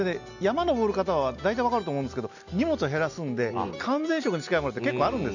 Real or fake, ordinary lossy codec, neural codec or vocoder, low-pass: real; none; none; 7.2 kHz